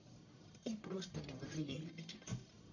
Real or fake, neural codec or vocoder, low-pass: fake; codec, 44.1 kHz, 1.7 kbps, Pupu-Codec; 7.2 kHz